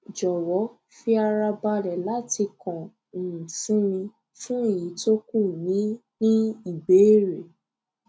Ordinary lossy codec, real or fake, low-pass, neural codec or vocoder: none; real; none; none